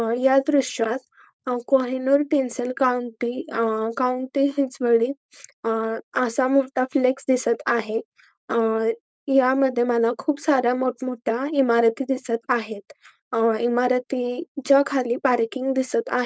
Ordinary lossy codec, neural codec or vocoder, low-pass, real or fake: none; codec, 16 kHz, 4.8 kbps, FACodec; none; fake